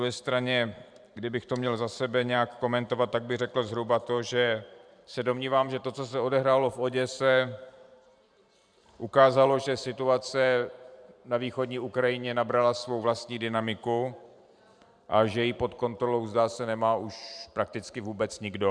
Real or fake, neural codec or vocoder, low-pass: fake; vocoder, 44.1 kHz, 128 mel bands every 512 samples, BigVGAN v2; 9.9 kHz